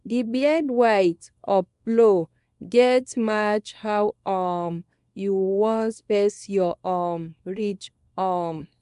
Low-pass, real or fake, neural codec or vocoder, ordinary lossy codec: 10.8 kHz; fake; codec, 24 kHz, 0.9 kbps, WavTokenizer, small release; none